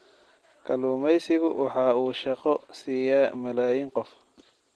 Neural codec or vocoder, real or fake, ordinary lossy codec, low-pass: none; real; Opus, 16 kbps; 10.8 kHz